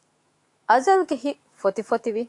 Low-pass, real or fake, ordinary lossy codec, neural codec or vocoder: 10.8 kHz; fake; AAC, 48 kbps; autoencoder, 48 kHz, 128 numbers a frame, DAC-VAE, trained on Japanese speech